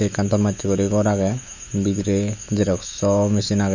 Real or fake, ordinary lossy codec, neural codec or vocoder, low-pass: fake; none; autoencoder, 48 kHz, 128 numbers a frame, DAC-VAE, trained on Japanese speech; 7.2 kHz